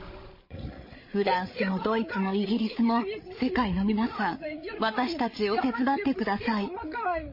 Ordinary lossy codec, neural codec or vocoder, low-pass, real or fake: MP3, 24 kbps; codec, 16 kHz, 8 kbps, FreqCodec, larger model; 5.4 kHz; fake